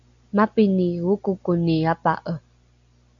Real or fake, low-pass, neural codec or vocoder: real; 7.2 kHz; none